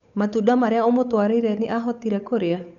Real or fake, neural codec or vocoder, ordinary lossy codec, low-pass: fake; codec, 16 kHz, 8 kbps, FunCodec, trained on Chinese and English, 25 frames a second; none; 7.2 kHz